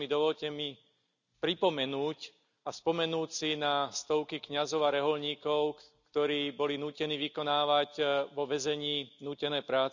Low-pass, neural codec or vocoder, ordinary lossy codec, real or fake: 7.2 kHz; none; none; real